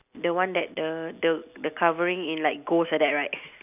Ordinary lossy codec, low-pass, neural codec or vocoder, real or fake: none; 3.6 kHz; none; real